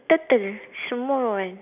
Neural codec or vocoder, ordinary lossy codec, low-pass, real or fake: none; none; 3.6 kHz; real